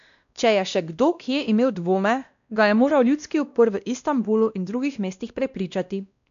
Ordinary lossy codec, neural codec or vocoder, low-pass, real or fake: none; codec, 16 kHz, 1 kbps, X-Codec, WavLM features, trained on Multilingual LibriSpeech; 7.2 kHz; fake